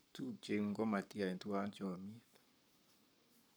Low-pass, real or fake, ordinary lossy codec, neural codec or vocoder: none; fake; none; codec, 44.1 kHz, 7.8 kbps, Pupu-Codec